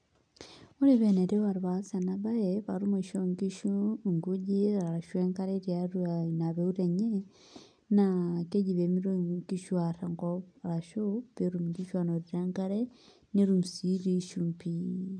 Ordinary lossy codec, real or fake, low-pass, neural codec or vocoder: none; real; 9.9 kHz; none